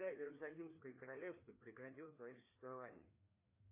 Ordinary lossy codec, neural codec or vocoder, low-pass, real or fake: AAC, 32 kbps; codec, 16 kHz, 2 kbps, FreqCodec, larger model; 3.6 kHz; fake